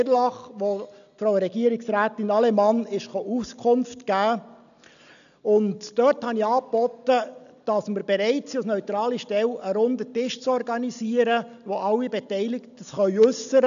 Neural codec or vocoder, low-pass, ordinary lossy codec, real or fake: none; 7.2 kHz; none; real